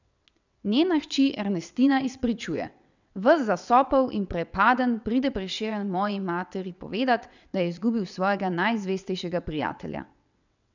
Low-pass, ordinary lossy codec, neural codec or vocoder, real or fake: 7.2 kHz; none; vocoder, 22.05 kHz, 80 mel bands, WaveNeXt; fake